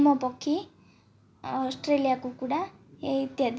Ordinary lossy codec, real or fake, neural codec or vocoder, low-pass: none; real; none; none